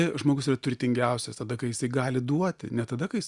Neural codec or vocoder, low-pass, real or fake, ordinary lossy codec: none; 10.8 kHz; real; Opus, 64 kbps